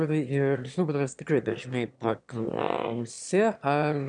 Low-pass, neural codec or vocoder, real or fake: 9.9 kHz; autoencoder, 22.05 kHz, a latent of 192 numbers a frame, VITS, trained on one speaker; fake